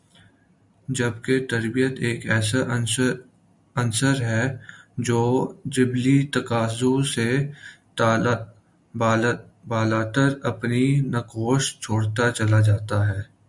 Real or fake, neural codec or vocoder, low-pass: real; none; 10.8 kHz